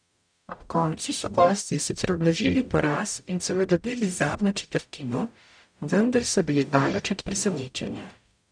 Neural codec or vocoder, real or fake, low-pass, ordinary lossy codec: codec, 44.1 kHz, 0.9 kbps, DAC; fake; 9.9 kHz; none